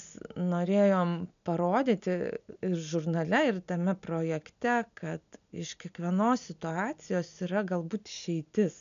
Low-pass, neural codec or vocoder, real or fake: 7.2 kHz; none; real